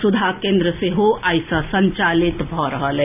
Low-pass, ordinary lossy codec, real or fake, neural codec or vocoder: 3.6 kHz; none; real; none